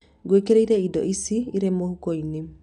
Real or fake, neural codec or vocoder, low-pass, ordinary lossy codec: real; none; 10.8 kHz; none